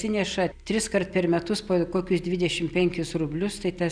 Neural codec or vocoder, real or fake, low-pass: none; real; 9.9 kHz